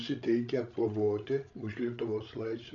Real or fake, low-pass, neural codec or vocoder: fake; 7.2 kHz; codec, 16 kHz, 16 kbps, FreqCodec, larger model